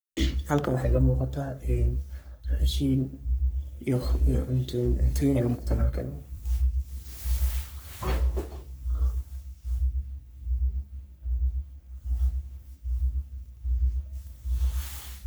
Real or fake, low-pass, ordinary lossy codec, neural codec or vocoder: fake; none; none; codec, 44.1 kHz, 3.4 kbps, Pupu-Codec